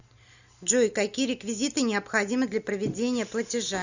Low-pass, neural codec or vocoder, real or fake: 7.2 kHz; none; real